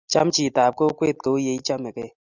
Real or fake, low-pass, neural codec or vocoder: real; 7.2 kHz; none